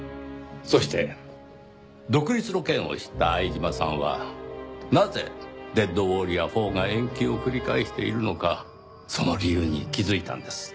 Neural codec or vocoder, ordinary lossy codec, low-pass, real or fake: none; none; none; real